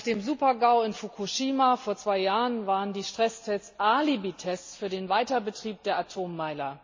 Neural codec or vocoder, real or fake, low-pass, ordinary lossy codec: none; real; 7.2 kHz; MP3, 32 kbps